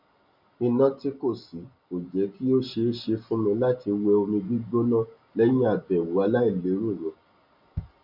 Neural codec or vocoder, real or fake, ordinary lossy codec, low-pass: none; real; none; 5.4 kHz